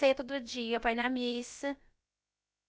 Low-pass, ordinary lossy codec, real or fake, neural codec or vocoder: none; none; fake; codec, 16 kHz, about 1 kbps, DyCAST, with the encoder's durations